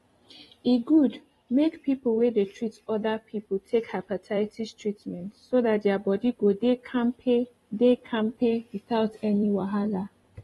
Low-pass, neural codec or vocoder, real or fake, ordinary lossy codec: 19.8 kHz; vocoder, 44.1 kHz, 128 mel bands every 256 samples, BigVGAN v2; fake; AAC, 32 kbps